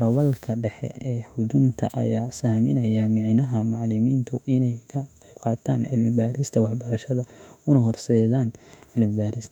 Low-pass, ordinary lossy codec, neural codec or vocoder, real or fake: 19.8 kHz; none; autoencoder, 48 kHz, 32 numbers a frame, DAC-VAE, trained on Japanese speech; fake